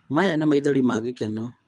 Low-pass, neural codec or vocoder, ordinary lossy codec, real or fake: 10.8 kHz; codec, 24 kHz, 3 kbps, HILCodec; none; fake